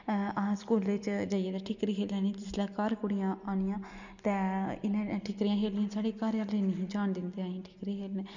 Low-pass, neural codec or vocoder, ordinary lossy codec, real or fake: 7.2 kHz; none; none; real